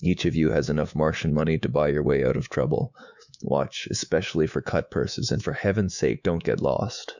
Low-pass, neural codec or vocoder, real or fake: 7.2 kHz; codec, 24 kHz, 3.1 kbps, DualCodec; fake